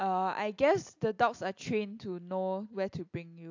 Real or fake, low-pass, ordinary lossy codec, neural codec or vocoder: real; 7.2 kHz; AAC, 48 kbps; none